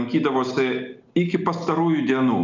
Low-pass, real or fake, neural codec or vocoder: 7.2 kHz; real; none